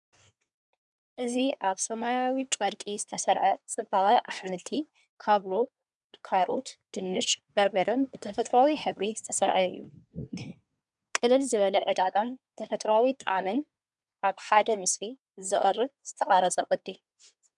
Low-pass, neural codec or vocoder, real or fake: 10.8 kHz; codec, 24 kHz, 1 kbps, SNAC; fake